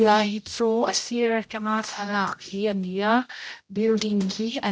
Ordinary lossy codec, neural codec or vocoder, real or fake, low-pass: none; codec, 16 kHz, 0.5 kbps, X-Codec, HuBERT features, trained on general audio; fake; none